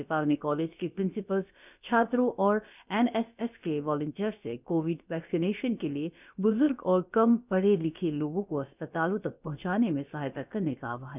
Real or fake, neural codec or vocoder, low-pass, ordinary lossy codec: fake; codec, 16 kHz, about 1 kbps, DyCAST, with the encoder's durations; 3.6 kHz; none